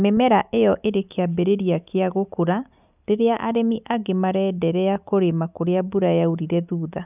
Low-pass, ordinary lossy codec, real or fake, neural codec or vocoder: 3.6 kHz; none; real; none